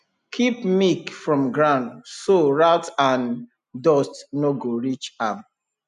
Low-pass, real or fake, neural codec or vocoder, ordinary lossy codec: 10.8 kHz; real; none; none